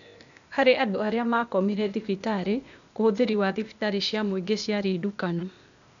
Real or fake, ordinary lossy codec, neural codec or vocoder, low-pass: fake; none; codec, 16 kHz, 0.8 kbps, ZipCodec; 7.2 kHz